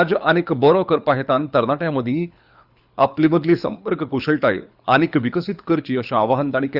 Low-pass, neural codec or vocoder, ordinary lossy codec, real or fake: 5.4 kHz; codec, 24 kHz, 6 kbps, HILCodec; Opus, 64 kbps; fake